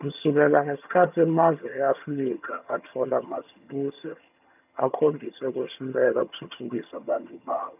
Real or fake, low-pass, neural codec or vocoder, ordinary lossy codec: fake; 3.6 kHz; vocoder, 22.05 kHz, 80 mel bands, HiFi-GAN; none